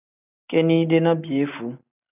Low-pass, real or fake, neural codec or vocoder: 3.6 kHz; real; none